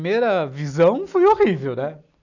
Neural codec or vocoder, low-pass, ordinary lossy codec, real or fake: none; 7.2 kHz; none; real